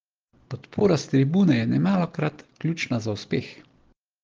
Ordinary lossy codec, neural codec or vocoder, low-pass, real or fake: Opus, 16 kbps; none; 7.2 kHz; real